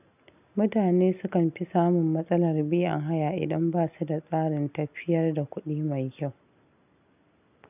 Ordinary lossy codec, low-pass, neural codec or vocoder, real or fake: none; 3.6 kHz; none; real